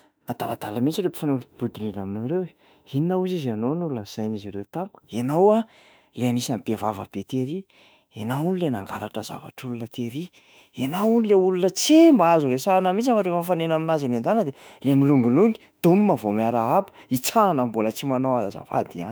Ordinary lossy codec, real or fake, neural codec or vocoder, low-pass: none; fake; autoencoder, 48 kHz, 32 numbers a frame, DAC-VAE, trained on Japanese speech; none